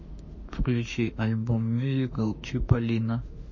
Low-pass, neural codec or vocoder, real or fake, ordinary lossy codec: 7.2 kHz; autoencoder, 48 kHz, 32 numbers a frame, DAC-VAE, trained on Japanese speech; fake; MP3, 32 kbps